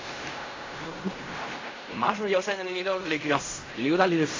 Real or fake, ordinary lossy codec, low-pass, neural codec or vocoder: fake; AAC, 32 kbps; 7.2 kHz; codec, 16 kHz in and 24 kHz out, 0.4 kbps, LongCat-Audio-Codec, fine tuned four codebook decoder